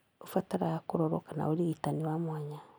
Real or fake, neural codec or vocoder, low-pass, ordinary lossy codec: real; none; none; none